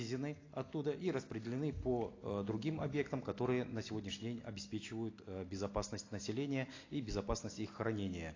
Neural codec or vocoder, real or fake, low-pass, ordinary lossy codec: none; real; 7.2 kHz; AAC, 32 kbps